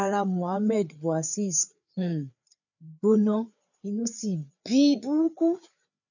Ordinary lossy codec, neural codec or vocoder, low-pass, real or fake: none; codec, 16 kHz, 4 kbps, FreqCodec, larger model; 7.2 kHz; fake